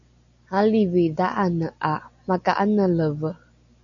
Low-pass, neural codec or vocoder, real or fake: 7.2 kHz; none; real